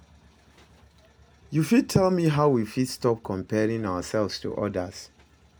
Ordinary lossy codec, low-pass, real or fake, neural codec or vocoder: none; none; real; none